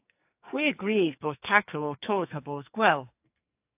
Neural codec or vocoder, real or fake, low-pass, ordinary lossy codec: codec, 44.1 kHz, 2.6 kbps, SNAC; fake; 3.6 kHz; AAC, 32 kbps